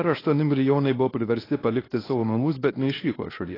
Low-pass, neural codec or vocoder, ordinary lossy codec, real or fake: 5.4 kHz; codec, 24 kHz, 0.9 kbps, WavTokenizer, medium speech release version 1; AAC, 24 kbps; fake